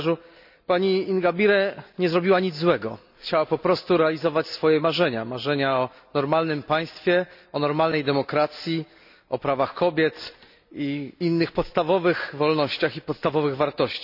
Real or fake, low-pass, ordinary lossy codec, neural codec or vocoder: real; 5.4 kHz; none; none